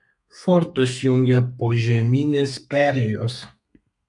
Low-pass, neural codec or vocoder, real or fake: 10.8 kHz; codec, 32 kHz, 1.9 kbps, SNAC; fake